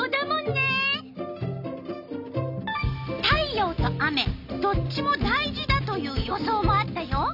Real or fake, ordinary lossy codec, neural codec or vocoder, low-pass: real; none; none; 5.4 kHz